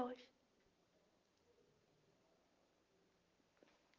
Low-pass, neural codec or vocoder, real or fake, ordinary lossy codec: 7.2 kHz; none; real; Opus, 32 kbps